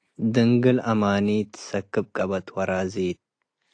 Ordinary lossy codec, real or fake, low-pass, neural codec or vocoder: AAC, 48 kbps; real; 9.9 kHz; none